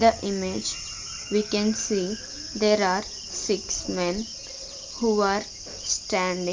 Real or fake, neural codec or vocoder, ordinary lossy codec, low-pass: real; none; Opus, 32 kbps; 7.2 kHz